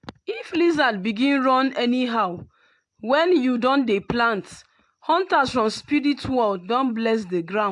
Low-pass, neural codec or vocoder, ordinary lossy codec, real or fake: 10.8 kHz; none; AAC, 64 kbps; real